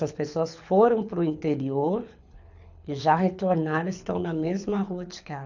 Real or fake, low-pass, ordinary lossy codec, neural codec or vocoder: fake; 7.2 kHz; none; codec, 24 kHz, 6 kbps, HILCodec